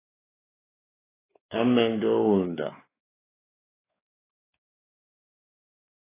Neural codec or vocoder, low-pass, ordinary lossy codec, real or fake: codec, 16 kHz, 4 kbps, X-Codec, WavLM features, trained on Multilingual LibriSpeech; 3.6 kHz; AAC, 16 kbps; fake